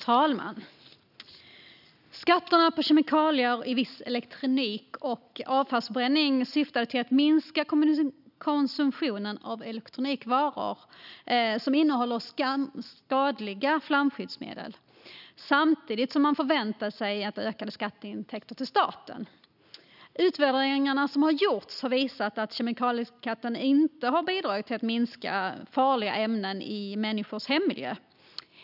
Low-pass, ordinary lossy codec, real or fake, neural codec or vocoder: 5.4 kHz; none; real; none